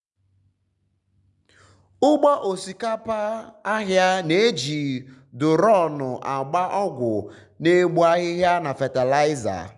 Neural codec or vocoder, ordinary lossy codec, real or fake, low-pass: none; none; real; 10.8 kHz